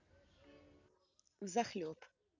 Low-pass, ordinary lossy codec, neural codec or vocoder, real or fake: 7.2 kHz; none; none; real